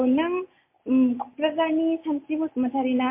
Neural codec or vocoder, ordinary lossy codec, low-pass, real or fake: none; MP3, 24 kbps; 3.6 kHz; real